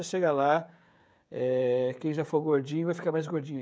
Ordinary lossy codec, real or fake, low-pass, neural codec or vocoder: none; fake; none; codec, 16 kHz, 16 kbps, FreqCodec, smaller model